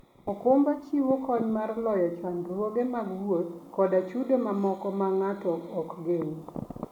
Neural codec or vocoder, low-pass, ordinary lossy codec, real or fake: none; 19.8 kHz; none; real